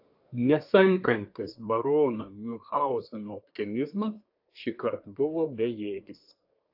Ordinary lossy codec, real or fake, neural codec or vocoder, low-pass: AAC, 48 kbps; fake; codec, 24 kHz, 1 kbps, SNAC; 5.4 kHz